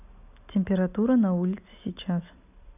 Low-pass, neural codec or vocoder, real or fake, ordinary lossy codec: 3.6 kHz; none; real; none